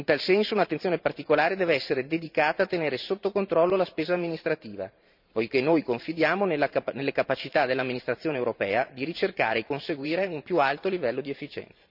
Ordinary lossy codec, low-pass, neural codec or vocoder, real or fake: none; 5.4 kHz; vocoder, 44.1 kHz, 128 mel bands every 512 samples, BigVGAN v2; fake